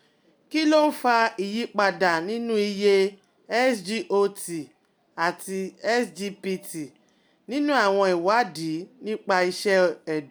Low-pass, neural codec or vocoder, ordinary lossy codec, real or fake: none; none; none; real